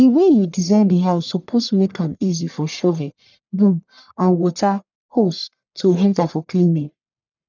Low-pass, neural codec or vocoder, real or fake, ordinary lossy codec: 7.2 kHz; codec, 44.1 kHz, 1.7 kbps, Pupu-Codec; fake; none